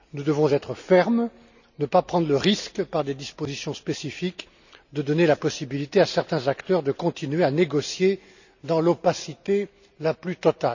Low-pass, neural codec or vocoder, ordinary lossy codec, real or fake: 7.2 kHz; none; none; real